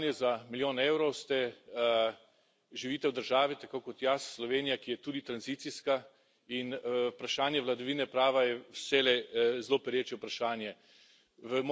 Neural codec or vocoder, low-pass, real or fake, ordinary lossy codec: none; none; real; none